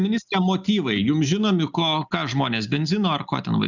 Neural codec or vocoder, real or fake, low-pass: none; real; 7.2 kHz